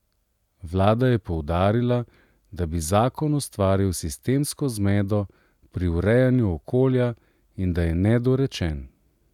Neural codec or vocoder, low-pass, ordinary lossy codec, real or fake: none; 19.8 kHz; none; real